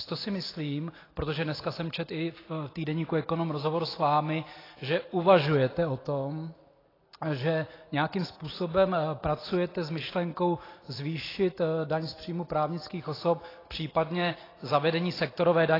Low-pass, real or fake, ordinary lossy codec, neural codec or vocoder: 5.4 kHz; real; AAC, 24 kbps; none